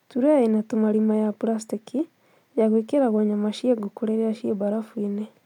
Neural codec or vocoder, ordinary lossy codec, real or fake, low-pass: none; none; real; 19.8 kHz